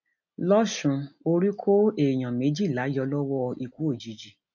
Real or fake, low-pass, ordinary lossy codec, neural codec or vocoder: real; 7.2 kHz; none; none